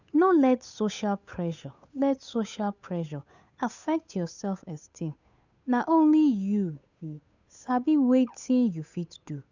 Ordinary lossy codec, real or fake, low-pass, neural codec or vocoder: none; fake; 7.2 kHz; codec, 16 kHz, 8 kbps, FunCodec, trained on Chinese and English, 25 frames a second